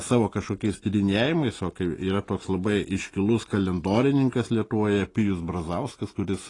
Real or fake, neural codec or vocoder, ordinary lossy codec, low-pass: real; none; AAC, 32 kbps; 10.8 kHz